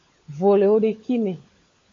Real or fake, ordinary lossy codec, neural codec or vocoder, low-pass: fake; AAC, 32 kbps; codec, 16 kHz, 16 kbps, FunCodec, trained on LibriTTS, 50 frames a second; 7.2 kHz